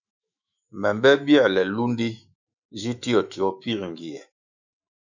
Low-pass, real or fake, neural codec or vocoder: 7.2 kHz; fake; autoencoder, 48 kHz, 128 numbers a frame, DAC-VAE, trained on Japanese speech